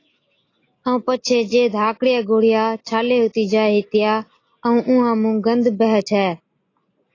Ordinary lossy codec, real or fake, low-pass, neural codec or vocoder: AAC, 32 kbps; real; 7.2 kHz; none